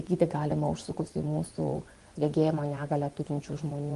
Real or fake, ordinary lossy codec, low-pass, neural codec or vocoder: fake; Opus, 24 kbps; 10.8 kHz; vocoder, 24 kHz, 100 mel bands, Vocos